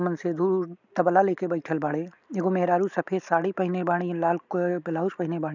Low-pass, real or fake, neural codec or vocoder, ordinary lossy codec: 7.2 kHz; real; none; none